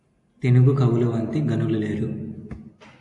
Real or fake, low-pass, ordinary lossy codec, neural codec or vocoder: real; 10.8 kHz; AAC, 48 kbps; none